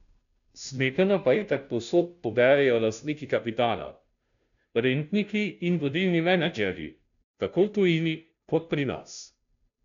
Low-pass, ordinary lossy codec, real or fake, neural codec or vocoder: 7.2 kHz; none; fake; codec, 16 kHz, 0.5 kbps, FunCodec, trained on Chinese and English, 25 frames a second